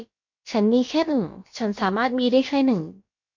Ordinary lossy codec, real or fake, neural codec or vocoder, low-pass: MP3, 48 kbps; fake; codec, 16 kHz, about 1 kbps, DyCAST, with the encoder's durations; 7.2 kHz